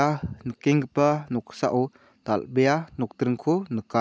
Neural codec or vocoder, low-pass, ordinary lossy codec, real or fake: none; none; none; real